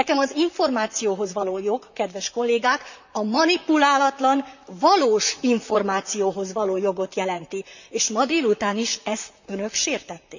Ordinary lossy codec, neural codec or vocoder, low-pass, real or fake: none; vocoder, 44.1 kHz, 128 mel bands, Pupu-Vocoder; 7.2 kHz; fake